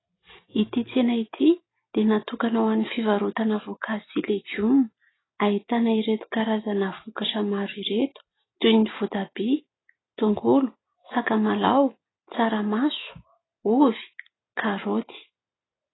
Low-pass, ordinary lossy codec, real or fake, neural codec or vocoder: 7.2 kHz; AAC, 16 kbps; real; none